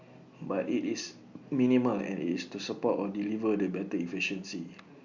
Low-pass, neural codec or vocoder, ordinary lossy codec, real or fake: 7.2 kHz; none; Opus, 64 kbps; real